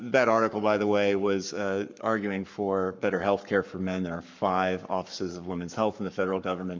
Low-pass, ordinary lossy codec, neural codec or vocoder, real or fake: 7.2 kHz; MP3, 64 kbps; codec, 44.1 kHz, 7.8 kbps, Pupu-Codec; fake